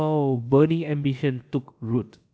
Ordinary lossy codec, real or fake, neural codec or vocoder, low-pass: none; fake; codec, 16 kHz, about 1 kbps, DyCAST, with the encoder's durations; none